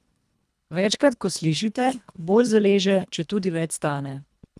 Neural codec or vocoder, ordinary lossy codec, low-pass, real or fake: codec, 24 kHz, 1.5 kbps, HILCodec; none; none; fake